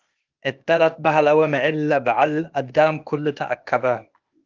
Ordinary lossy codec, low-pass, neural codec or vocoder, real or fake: Opus, 24 kbps; 7.2 kHz; codec, 16 kHz, 0.8 kbps, ZipCodec; fake